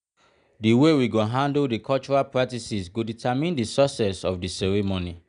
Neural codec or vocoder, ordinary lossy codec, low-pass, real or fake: none; none; 9.9 kHz; real